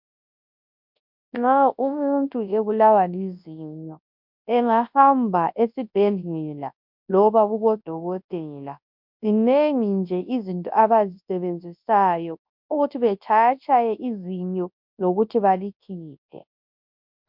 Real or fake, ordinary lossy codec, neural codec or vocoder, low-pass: fake; MP3, 48 kbps; codec, 24 kHz, 0.9 kbps, WavTokenizer, large speech release; 5.4 kHz